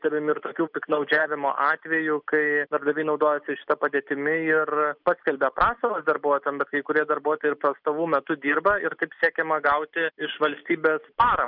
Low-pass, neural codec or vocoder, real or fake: 5.4 kHz; none; real